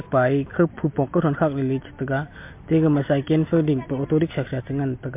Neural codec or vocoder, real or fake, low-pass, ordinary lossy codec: none; real; 3.6 kHz; MP3, 32 kbps